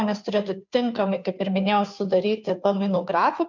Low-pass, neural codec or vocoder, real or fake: 7.2 kHz; codec, 16 kHz, 2 kbps, FunCodec, trained on Chinese and English, 25 frames a second; fake